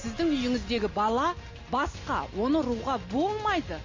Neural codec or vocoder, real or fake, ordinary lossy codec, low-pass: none; real; MP3, 32 kbps; 7.2 kHz